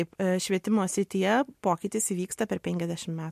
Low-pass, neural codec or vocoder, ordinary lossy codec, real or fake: 14.4 kHz; none; MP3, 64 kbps; real